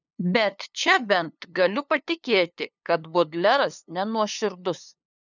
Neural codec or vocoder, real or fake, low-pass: codec, 16 kHz, 2 kbps, FunCodec, trained on LibriTTS, 25 frames a second; fake; 7.2 kHz